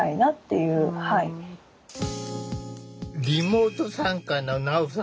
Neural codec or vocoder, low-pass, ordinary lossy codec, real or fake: none; none; none; real